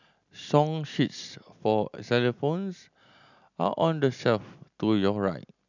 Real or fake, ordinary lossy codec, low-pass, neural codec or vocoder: real; none; 7.2 kHz; none